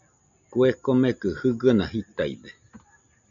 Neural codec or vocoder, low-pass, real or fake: none; 7.2 kHz; real